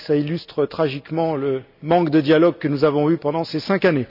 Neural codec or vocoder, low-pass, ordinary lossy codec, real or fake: none; 5.4 kHz; none; real